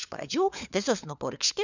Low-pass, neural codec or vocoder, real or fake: 7.2 kHz; codec, 16 kHz, 4 kbps, FunCodec, trained on LibriTTS, 50 frames a second; fake